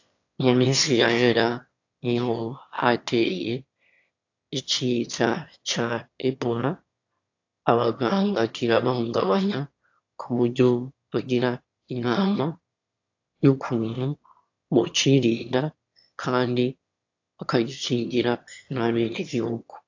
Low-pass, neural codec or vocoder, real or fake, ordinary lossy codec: 7.2 kHz; autoencoder, 22.05 kHz, a latent of 192 numbers a frame, VITS, trained on one speaker; fake; AAC, 48 kbps